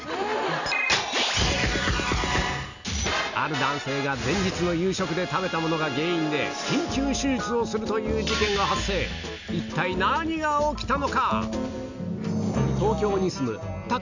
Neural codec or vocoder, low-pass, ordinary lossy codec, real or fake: none; 7.2 kHz; none; real